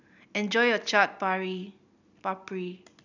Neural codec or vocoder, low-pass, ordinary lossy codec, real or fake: none; 7.2 kHz; none; real